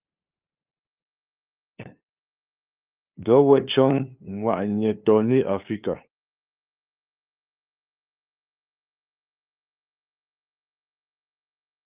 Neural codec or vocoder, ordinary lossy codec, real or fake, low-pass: codec, 16 kHz, 2 kbps, FunCodec, trained on LibriTTS, 25 frames a second; Opus, 24 kbps; fake; 3.6 kHz